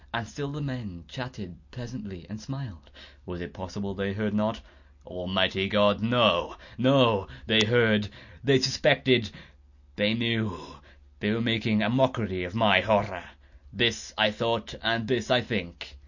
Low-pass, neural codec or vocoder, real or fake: 7.2 kHz; none; real